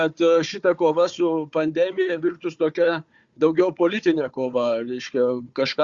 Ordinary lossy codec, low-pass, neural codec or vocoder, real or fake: Opus, 64 kbps; 7.2 kHz; codec, 16 kHz, 4 kbps, FunCodec, trained on Chinese and English, 50 frames a second; fake